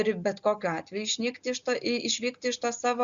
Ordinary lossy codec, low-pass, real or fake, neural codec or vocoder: Opus, 64 kbps; 7.2 kHz; real; none